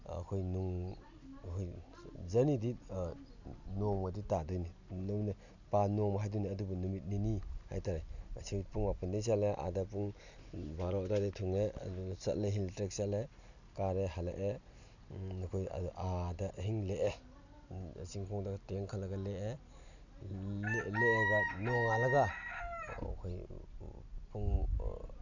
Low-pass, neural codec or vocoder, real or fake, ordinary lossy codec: 7.2 kHz; none; real; none